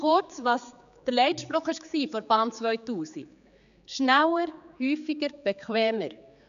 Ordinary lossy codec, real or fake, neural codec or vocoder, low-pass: none; fake; codec, 16 kHz, 4 kbps, X-Codec, HuBERT features, trained on general audio; 7.2 kHz